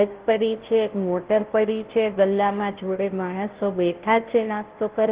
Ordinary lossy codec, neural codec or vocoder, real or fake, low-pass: Opus, 16 kbps; codec, 16 kHz, 0.5 kbps, FunCodec, trained on Chinese and English, 25 frames a second; fake; 3.6 kHz